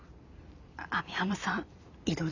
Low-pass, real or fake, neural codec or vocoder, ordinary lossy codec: 7.2 kHz; fake; vocoder, 44.1 kHz, 128 mel bands every 256 samples, BigVGAN v2; none